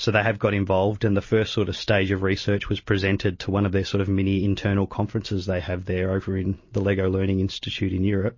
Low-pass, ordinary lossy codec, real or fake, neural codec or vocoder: 7.2 kHz; MP3, 32 kbps; real; none